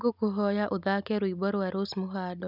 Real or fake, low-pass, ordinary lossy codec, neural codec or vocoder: real; 5.4 kHz; none; none